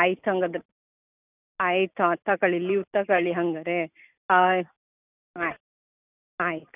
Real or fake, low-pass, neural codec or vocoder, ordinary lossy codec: real; 3.6 kHz; none; none